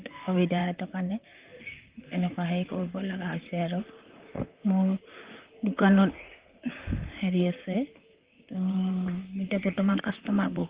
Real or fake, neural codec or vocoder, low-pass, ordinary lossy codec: fake; vocoder, 44.1 kHz, 128 mel bands, Pupu-Vocoder; 3.6 kHz; Opus, 32 kbps